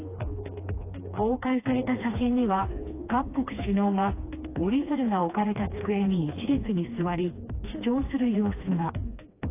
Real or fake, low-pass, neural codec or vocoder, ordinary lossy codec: fake; 3.6 kHz; codec, 16 kHz, 2 kbps, FreqCodec, smaller model; AAC, 24 kbps